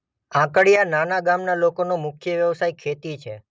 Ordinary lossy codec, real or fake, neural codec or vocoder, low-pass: none; real; none; none